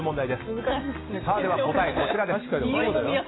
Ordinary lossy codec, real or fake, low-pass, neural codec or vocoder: AAC, 16 kbps; real; 7.2 kHz; none